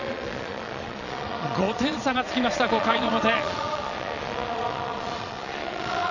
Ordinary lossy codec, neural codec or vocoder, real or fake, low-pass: none; vocoder, 22.05 kHz, 80 mel bands, Vocos; fake; 7.2 kHz